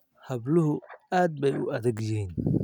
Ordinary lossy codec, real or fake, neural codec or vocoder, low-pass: none; real; none; 19.8 kHz